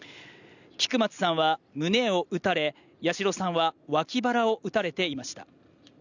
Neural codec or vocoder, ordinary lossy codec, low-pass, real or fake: none; none; 7.2 kHz; real